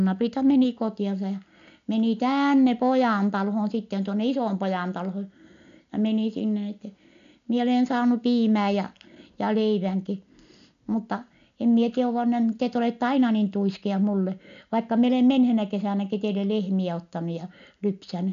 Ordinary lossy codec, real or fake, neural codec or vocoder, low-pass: none; real; none; 7.2 kHz